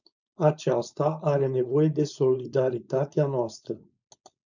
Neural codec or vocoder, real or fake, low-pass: codec, 16 kHz, 4.8 kbps, FACodec; fake; 7.2 kHz